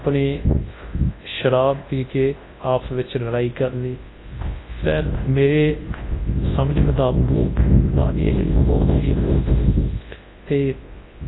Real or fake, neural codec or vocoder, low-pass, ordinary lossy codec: fake; codec, 24 kHz, 0.9 kbps, WavTokenizer, large speech release; 7.2 kHz; AAC, 16 kbps